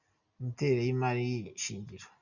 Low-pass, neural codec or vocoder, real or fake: 7.2 kHz; none; real